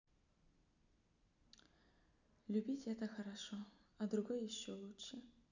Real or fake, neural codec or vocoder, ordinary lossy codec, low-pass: real; none; none; 7.2 kHz